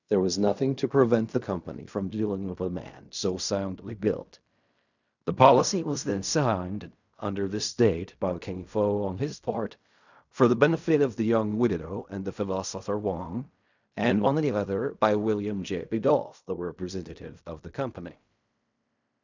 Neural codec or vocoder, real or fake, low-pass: codec, 16 kHz in and 24 kHz out, 0.4 kbps, LongCat-Audio-Codec, fine tuned four codebook decoder; fake; 7.2 kHz